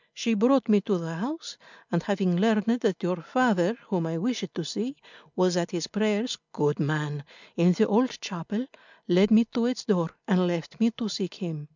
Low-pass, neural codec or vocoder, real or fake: 7.2 kHz; none; real